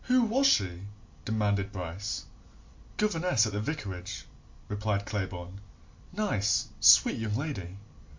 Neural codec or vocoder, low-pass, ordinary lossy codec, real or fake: none; 7.2 kHz; MP3, 48 kbps; real